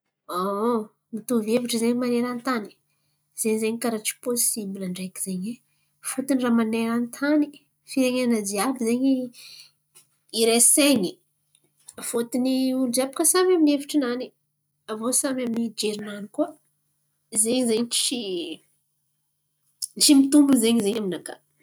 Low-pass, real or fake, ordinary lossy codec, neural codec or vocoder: none; real; none; none